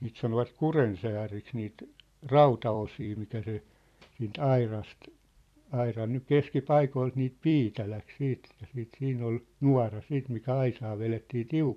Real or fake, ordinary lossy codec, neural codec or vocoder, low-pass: fake; AAC, 96 kbps; vocoder, 44.1 kHz, 128 mel bands every 256 samples, BigVGAN v2; 14.4 kHz